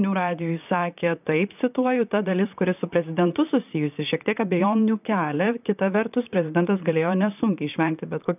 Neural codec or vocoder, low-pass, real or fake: vocoder, 44.1 kHz, 128 mel bands, Pupu-Vocoder; 3.6 kHz; fake